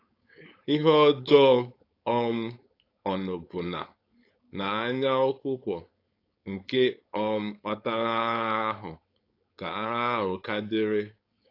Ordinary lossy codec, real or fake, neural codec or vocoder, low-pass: AAC, 32 kbps; fake; codec, 16 kHz, 4.8 kbps, FACodec; 5.4 kHz